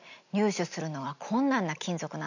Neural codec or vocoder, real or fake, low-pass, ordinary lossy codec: none; real; 7.2 kHz; none